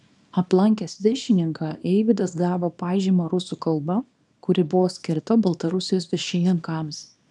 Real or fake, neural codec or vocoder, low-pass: fake; codec, 24 kHz, 0.9 kbps, WavTokenizer, small release; 10.8 kHz